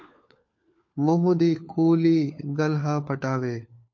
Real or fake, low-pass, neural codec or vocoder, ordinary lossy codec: fake; 7.2 kHz; codec, 16 kHz, 4 kbps, FunCodec, trained on LibriTTS, 50 frames a second; MP3, 48 kbps